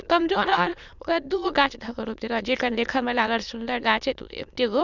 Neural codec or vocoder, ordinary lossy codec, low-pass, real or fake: autoencoder, 22.05 kHz, a latent of 192 numbers a frame, VITS, trained on many speakers; none; 7.2 kHz; fake